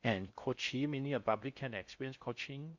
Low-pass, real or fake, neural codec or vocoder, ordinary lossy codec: 7.2 kHz; fake; codec, 16 kHz in and 24 kHz out, 0.6 kbps, FocalCodec, streaming, 4096 codes; Opus, 64 kbps